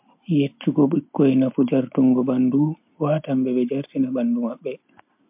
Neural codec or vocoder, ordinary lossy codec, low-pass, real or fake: none; MP3, 32 kbps; 3.6 kHz; real